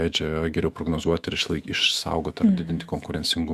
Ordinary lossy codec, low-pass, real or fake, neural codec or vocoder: Opus, 64 kbps; 14.4 kHz; fake; autoencoder, 48 kHz, 128 numbers a frame, DAC-VAE, trained on Japanese speech